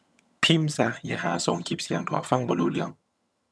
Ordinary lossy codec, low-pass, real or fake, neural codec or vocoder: none; none; fake; vocoder, 22.05 kHz, 80 mel bands, HiFi-GAN